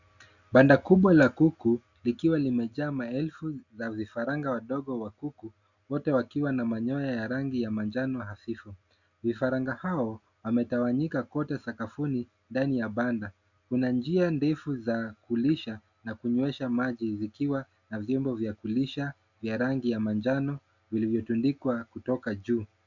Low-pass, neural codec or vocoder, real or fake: 7.2 kHz; none; real